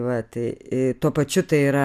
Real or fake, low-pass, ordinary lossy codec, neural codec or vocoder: real; 14.4 kHz; MP3, 96 kbps; none